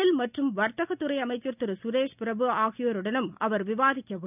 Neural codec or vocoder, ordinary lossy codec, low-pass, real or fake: none; none; 3.6 kHz; real